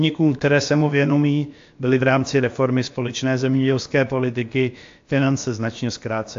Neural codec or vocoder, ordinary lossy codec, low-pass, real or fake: codec, 16 kHz, about 1 kbps, DyCAST, with the encoder's durations; AAC, 48 kbps; 7.2 kHz; fake